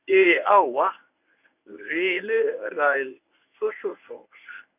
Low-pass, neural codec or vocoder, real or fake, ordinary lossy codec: 3.6 kHz; codec, 24 kHz, 0.9 kbps, WavTokenizer, medium speech release version 1; fake; none